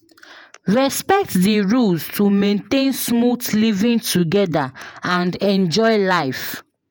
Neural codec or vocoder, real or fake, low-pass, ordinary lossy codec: vocoder, 48 kHz, 128 mel bands, Vocos; fake; none; none